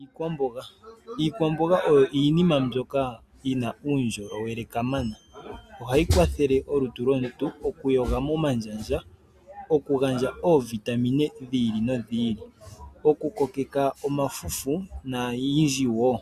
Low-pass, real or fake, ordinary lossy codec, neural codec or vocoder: 14.4 kHz; real; Opus, 64 kbps; none